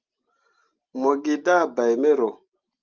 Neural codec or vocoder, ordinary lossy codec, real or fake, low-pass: none; Opus, 32 kbps; real; 7.2 kHz